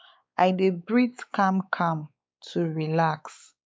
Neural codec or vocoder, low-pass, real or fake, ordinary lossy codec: codec, 16 kHz, 4 kbps, X-Codec, WavLM features, trained on Multilingual LibriSpeech; none; fake; none